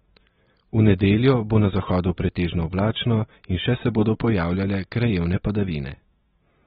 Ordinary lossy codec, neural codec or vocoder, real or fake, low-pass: AAC, 16 kbps; none; real; 14.4 kHz